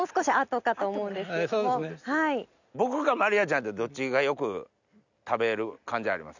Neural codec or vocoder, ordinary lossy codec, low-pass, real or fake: none; none; 7.2 kHz; real